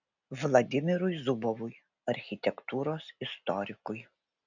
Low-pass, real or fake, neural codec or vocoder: 7.2 kHz; real; none